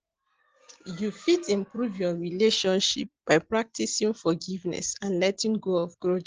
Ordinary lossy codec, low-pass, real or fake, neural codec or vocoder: Opus, 16 kbps; 7.2 kHz; real; none